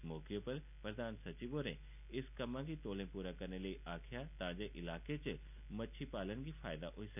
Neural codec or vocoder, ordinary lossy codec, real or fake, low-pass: none; none; real; 3.6 kHz